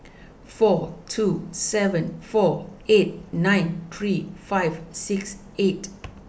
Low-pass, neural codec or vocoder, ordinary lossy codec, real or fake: none; none; none; real